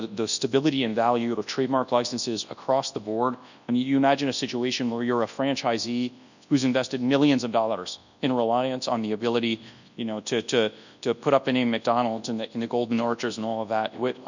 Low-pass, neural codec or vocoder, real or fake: 7.2 kHz; codec, 24 kHz, 0.9 kbps, WavTokenizer, large speech release; fake